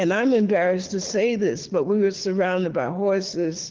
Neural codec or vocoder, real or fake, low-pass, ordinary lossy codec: codec, 24 kHz, 6 kbps, HILCodec; fake; 7.2 kHz; Opus, 16 kbps